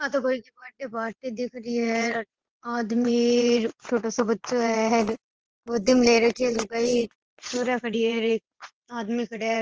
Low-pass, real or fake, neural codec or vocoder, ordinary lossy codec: 7.2 kHz; fake; vocoder, 22.05 kHz, 80 mel bands, WaveNeXt; Opus, 16 kbps